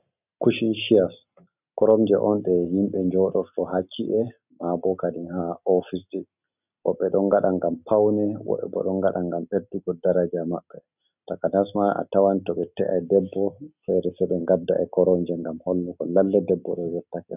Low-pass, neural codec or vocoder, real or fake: 3.6 kHz; none; real